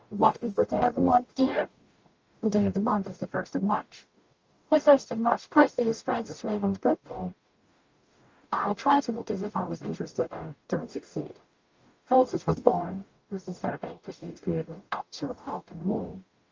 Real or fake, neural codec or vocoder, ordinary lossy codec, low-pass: fake; codec, 44.1 kHz, 0.9 kbps, DAC; Opus, 24 kbps; 7.2 kHz